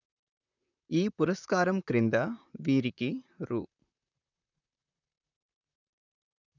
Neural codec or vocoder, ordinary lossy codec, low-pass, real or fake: vocoder, 44.1 kHz, 128 mel bands, Pupu-Vocoder; none; 7.2 kHz; fake